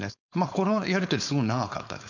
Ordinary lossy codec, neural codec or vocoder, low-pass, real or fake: none; codec, 16 kHz, 4.8 kbps, FACodec; 7.2 kHz; fake